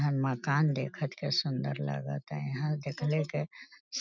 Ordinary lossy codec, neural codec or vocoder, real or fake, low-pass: none; none; real; 7.2 kHz